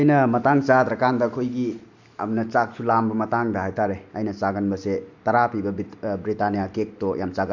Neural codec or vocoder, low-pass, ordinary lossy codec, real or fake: none; 7.2 kHz; none; real